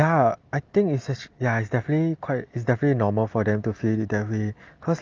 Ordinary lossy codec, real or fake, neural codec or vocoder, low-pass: Opus, 24 kbps; real; none; 7.2 kHz